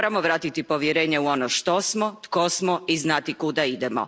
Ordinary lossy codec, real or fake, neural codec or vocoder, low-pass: none; real; none; none